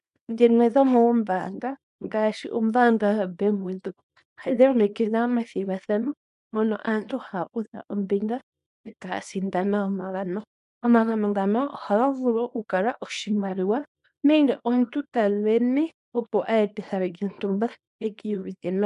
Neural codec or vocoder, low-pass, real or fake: codec, 24 kHz, 0.9 kbps, WavTokenizer, small release; 10.8 kHz; fake